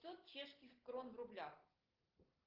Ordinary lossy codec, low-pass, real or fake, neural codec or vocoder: Opus, 16 kbps; 5.4 kHz; real; none